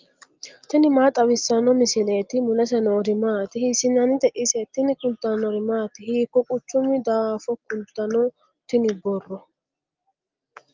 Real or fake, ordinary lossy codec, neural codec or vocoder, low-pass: real; Opus, 32 kbps; none; 7.2 kHz